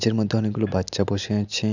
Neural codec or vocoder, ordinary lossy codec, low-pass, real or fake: none; none; 7.2 kHz; real